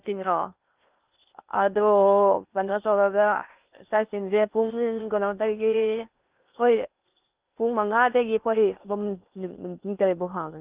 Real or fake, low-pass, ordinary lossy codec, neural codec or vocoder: fake; 3.6 kHz; Opus, 24 kbps; codec, 16 kHz in and 24 kHz out, 0.6 kbps, FocalCodec, streaming, 2048 codes